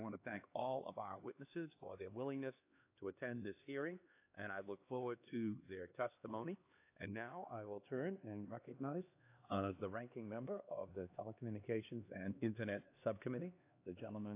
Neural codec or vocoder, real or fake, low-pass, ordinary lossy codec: codec, 16 kHz, 2 kbps, X-Codec, HuBERT features, trained on LibriSpeech; fake; 3.6 kHz; AAC, 24 kbps